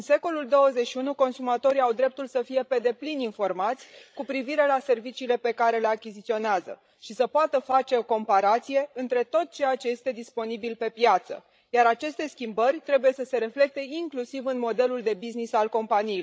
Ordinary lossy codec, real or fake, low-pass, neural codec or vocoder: none; fake; none; codec, 16 kHz, 16 kbps, FreqCodec, larger model